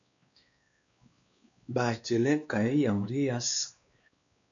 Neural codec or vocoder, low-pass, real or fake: codec, 16 kHz, 2 kbps, X-Codec, WavLM features, trained on Multilingual LibriSpeech; 7.2 kHz; fake